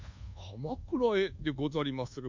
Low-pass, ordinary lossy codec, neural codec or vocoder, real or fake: 7.2 kHz; MP3, 64 kbps; codec, 24 kHz, 1.2 kbps, DualCodec; fake